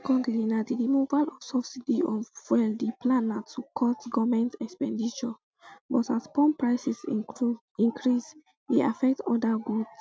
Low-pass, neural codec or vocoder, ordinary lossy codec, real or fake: none; none; none; real